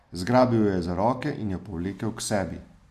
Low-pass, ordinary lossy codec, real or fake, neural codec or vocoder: 14.4 kHz; none; real; none